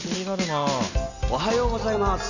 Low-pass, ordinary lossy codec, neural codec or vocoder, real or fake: 7.2 kHz; none; none; real